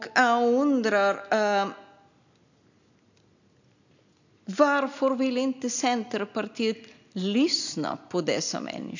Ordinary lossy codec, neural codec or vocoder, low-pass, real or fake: none; none; 7.2 kHz; real